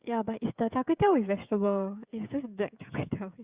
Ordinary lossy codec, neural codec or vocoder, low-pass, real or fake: Opus, 64 kbps; codec, 16 kHz, 16 kbps, FreqCodec, smaller model; 3.6 kHz; fake